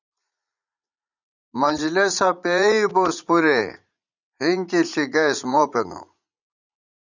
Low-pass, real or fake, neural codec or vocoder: 7.2 kHz; fake; vocoder, 44.1 kHz, 80 mel bands, Vocos